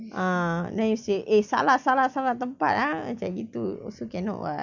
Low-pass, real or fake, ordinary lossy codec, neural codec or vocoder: 7.2 kHz; real; none; none